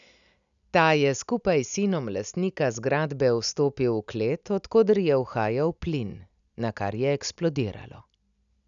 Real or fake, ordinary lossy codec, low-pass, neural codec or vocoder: real; none; 7.2 kHz; none